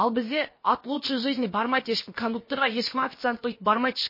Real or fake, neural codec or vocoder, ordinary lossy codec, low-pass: fake; codec, 16 kHz, about 1 kbps, DyCAST, with the encoder's durations; MP3, 24 kbps; 5.4 kHz